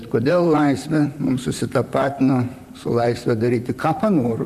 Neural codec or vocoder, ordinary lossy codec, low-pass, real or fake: vocoder, 44.1 kHz, 128 mel bands, Pupu-Vocoder; MP3, 96 kbps; 14.4 kHz; fake